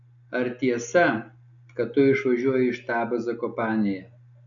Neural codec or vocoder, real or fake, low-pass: none; real; 7.2 kHz